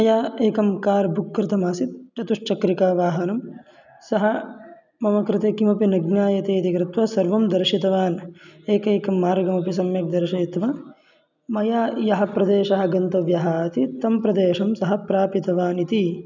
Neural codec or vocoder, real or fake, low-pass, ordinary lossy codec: none; real; 7.2 kHz; none